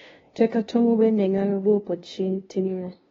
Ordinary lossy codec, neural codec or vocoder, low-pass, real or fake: AAC, 24 kbps; codec, 16 kHz, 0.5 kbps, FunCodec, trained on LibriTTS, 25 frames a second; 7.2 kHz; fake